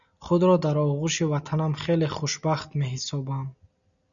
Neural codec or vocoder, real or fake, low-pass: none; real; 7.2 kHz